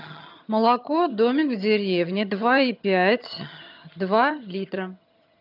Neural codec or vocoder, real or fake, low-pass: vocoder, 22.05 kHz, 80 mel bands, HiFi-GAN; fake; 5.4 kHz